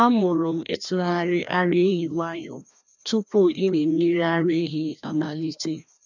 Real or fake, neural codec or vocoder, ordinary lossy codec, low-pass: fake; codec, 16 kHz, 1 kbps, FreqCodec, larger model; none; 7.2 kHz